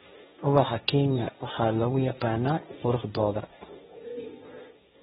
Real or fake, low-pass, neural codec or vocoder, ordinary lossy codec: fake; 7.2 kHz; codec, 16 kHz, 1.1 kbps, Voila-Tokenizer; AAC, 16 kbps